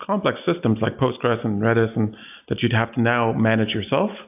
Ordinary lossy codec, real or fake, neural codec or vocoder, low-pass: AAC, 32 kbps; real; none; 3.6 kHz